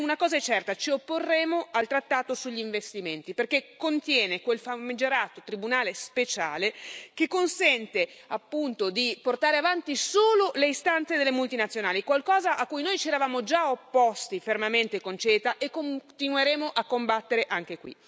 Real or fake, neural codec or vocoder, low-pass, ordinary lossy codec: real; none; none; none